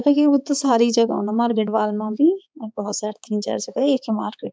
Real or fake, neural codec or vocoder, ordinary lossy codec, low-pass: fake; codec, 16 kHz, 4 kbps, X-Codec, HuBERT features, trained on balanced general audio; none; none